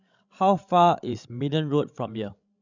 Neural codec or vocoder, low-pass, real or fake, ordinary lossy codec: codec, 16 kHz, 16 kbps, FreqCodec, larger model; 7.2 kHz; fake; none